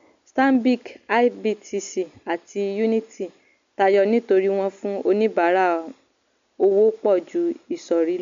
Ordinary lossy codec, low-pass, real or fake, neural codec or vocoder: MP3, 64 kbps; 7.2 kHz; real; none